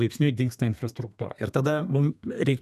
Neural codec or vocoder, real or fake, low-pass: codec, 32 kHz, 1.9 kbps, SNAC; fake; 14.4 kHz